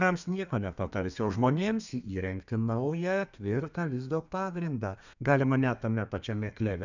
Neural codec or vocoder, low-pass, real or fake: codec, 32 kHz, 1.9 kbps, SNAC; 7.2 kHz; fake